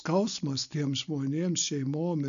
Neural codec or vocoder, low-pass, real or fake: none; 7.2 kHz; real